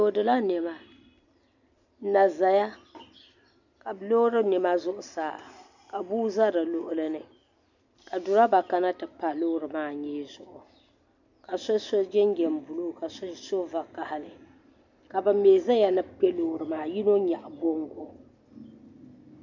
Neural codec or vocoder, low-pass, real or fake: none; 7.2 kHz; real